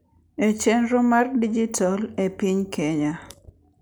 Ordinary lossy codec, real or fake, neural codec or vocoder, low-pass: none; real; none; none